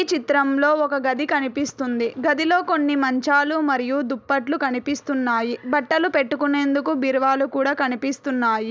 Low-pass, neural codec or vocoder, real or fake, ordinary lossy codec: none; none; real; none